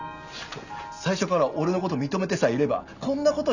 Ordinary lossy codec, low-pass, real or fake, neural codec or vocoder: none; 7.2 kHz; real; none